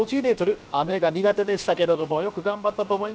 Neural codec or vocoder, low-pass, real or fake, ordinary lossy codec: codec, 16 kHz, 0.7 kbps, FocalCodec; none; fake; none